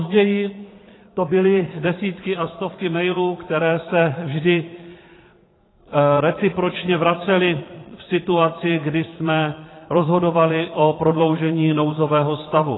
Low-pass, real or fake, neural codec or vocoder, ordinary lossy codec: 7.2 kHz; fake; vocoder, 22.05 kHz, 80 mel bands, Vocos; AAC, 16 kbps